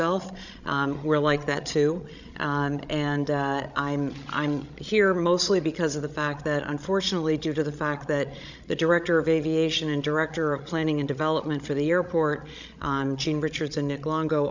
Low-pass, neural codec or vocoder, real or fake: 7.2 kHz; codec, 16 kHz, 16 kbps, FreqCodec, larger model; fake